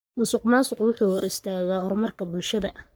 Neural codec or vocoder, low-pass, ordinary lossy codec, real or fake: codec, 44.1 kHz, 3.4 kbps, Pupu-Codec; none; none; fake